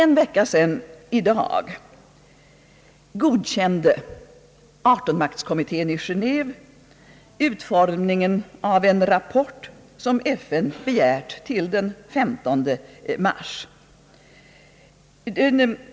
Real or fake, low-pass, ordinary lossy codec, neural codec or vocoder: real; none; none; none